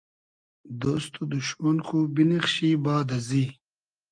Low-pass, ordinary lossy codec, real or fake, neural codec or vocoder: 9.9 kHz; Opus, 32 kbps; real; none